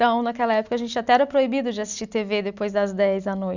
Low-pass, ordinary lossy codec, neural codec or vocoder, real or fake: 7.2 kHz; none; none; real